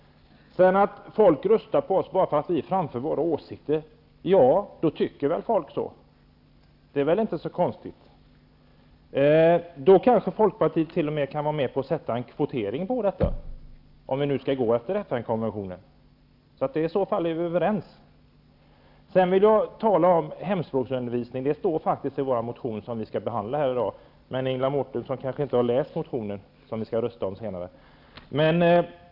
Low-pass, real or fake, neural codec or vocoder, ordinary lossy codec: 5.4 kHz; real; none; AAC, 48 kbps